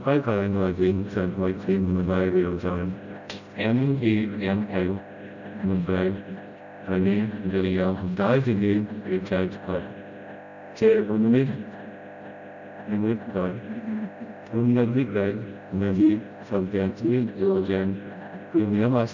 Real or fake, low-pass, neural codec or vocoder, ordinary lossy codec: fake; 7.2 kHz; codec, 16 kHz, 0.5 kbps, FreqCodec, smaller model; none